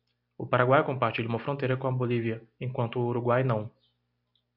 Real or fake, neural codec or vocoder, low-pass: real; none; 5.4 kHz